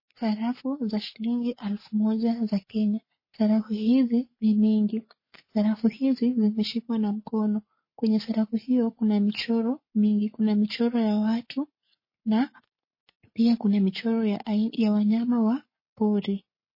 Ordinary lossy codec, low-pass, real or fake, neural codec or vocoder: MP3, 24 kbps; 5.4 kHz; fake; codec, 44.1 kHz, 7.8 kbps, Pupu-Codec